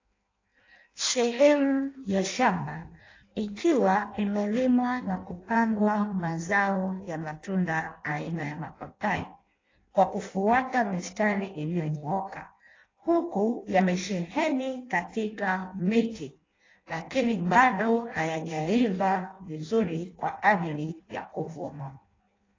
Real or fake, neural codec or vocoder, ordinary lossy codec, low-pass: fake; codec, 16 kHz in and 24 kHz out, 0.6 kbps, FireRedTTS-2 codec; AAC, 32 kbps; 7.2 kHz